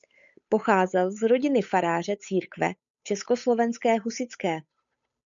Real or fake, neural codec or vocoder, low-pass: fake; codec, 16 kHz, 8 kbps, FunCodec, trained on Chinese and English, 25 frames a second; 7.2 kHz